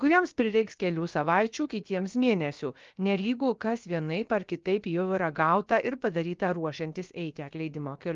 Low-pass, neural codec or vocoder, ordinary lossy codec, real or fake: 7.2 kHz; codec, 16 kHz, about 1 kbps, DyCAST, with the encoder's durations; Opus, 32 kbps; fake